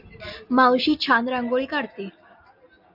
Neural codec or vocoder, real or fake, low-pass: none; real; 5.4 kHz